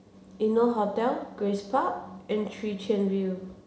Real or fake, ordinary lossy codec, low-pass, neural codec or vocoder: real; none; none; none